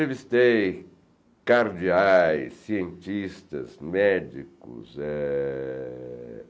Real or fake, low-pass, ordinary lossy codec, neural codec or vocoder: real; none; none; none